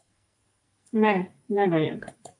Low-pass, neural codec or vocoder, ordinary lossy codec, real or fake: 10.8 kHz; codec, 44.1 kHz, 2.6 kbps, SNAC; AAC, 64 kbps; fake